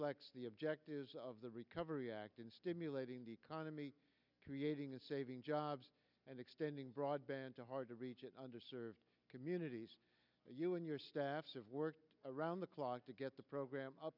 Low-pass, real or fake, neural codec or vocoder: 5.4 kHz; real; none